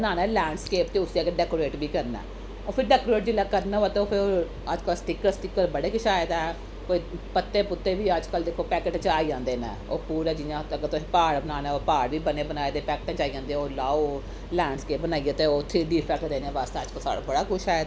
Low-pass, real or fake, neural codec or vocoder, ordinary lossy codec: none; real; none; none